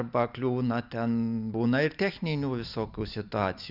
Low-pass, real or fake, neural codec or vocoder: 5.4 kHz; real; none